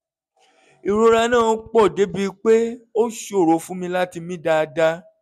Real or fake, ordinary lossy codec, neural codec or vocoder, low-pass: real; none; none; 14.4 kHz